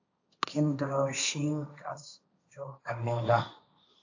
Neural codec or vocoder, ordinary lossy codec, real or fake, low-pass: codec, 16 kHz, 1.1 kbps, Voila-Tokenizer; AAC, 48 kbps; fake; 7.2 kHz